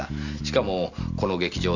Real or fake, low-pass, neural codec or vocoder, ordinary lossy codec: real; 7.2 kHz; none; none